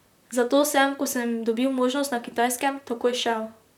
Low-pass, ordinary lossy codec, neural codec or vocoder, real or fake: 19.8 kHz; none; vocoder, 44.1 kHz, 128 mel bands, Pupu-Vocoder; fake